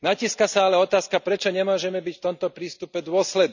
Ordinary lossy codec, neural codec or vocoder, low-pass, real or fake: none; none; 7.2 kHz; real